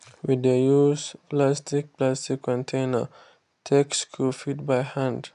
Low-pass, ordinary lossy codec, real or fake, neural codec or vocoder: 10.8 kHz; AAC, 96 kbps; real; none